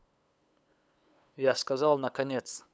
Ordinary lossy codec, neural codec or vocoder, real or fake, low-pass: none; codec, 16 kHz, 8 kbps, FunCodec, trained on LibriTTS, 25 frames a second; fake; none